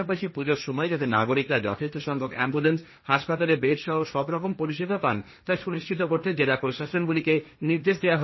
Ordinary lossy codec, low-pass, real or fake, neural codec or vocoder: MP3, 24 kbps; 7.2 kHz; fake; codec, 16 kHz, 1.1 kbps, Voila-Tokenizer